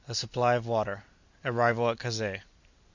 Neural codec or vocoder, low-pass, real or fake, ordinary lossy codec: none; 7.2 kHz; real; Opus, 64 kbps